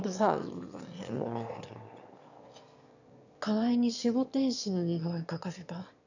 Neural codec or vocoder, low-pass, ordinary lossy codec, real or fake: autoencoder, 22.05 kHz, a latent of 192 numbers a frame, VITS, trained on one speaker; 7.2 kHz; none; fake